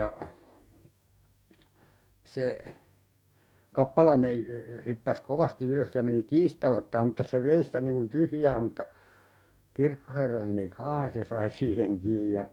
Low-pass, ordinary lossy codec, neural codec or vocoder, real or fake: 19.8 kHz; none; codec, 44.1 kHz, 2.6 kbps, DAC; fake